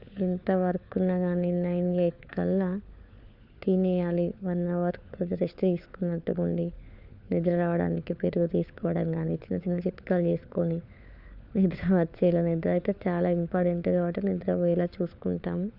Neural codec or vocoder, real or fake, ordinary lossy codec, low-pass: codec, 16 kHz, 4 kbps, FunCodec, trained on LibriTTS, 50 frames a second; fake; none; 5.4 kHz